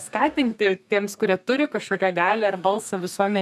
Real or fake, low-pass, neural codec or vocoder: fake; 14.4 kHz; codec, 32 kHz, 1.9 kbps, SNAC